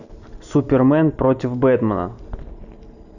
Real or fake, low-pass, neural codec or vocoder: real; 7.2 kHz; none